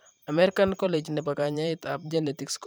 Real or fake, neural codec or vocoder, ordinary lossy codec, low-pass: fake; vocoder, 44.1 kHz, 128 mel bands every 512 samples, BigVGAN v2; none; none